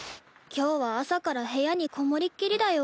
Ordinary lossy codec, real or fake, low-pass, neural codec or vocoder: none; real; none; none